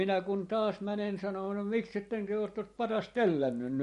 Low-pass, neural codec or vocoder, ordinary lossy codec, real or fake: 14.4 kHz; vocoder, 44.1 kHz, 128 mel bands every 512 samples, BigVGAN v2; MP3, 48 kbps; fake